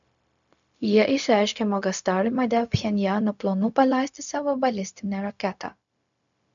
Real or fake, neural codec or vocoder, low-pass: fake; codec, 16 kHz, 0.4 kbps, LongCat-Audio-Codec; 7.2 kHz